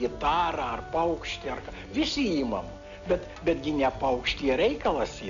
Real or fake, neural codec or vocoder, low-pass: real; none; 7.2 kHz